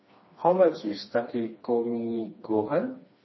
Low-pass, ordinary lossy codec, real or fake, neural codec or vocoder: 7.2 kHz; MP3, 24 kbps; fake; codec, 16 kHz, 2 kbps, FreqCodec, smaller model